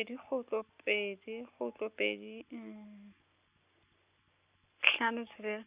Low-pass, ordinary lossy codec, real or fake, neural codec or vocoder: 3.6 kHz; Opus, 64 kbps; real; none